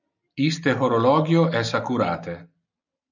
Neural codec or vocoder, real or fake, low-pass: none; real; 7.2 kHz